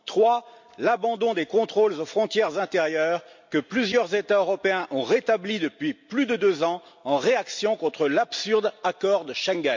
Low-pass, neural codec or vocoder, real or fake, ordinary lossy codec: 7.2 kHz; none; real; MP3, 48 kbps